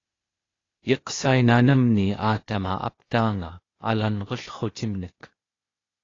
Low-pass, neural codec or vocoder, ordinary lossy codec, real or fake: 7.2 kHz; codec, 16 kHz, 0.8 kbps, ZipCodec; AAC, 32 kbps; fake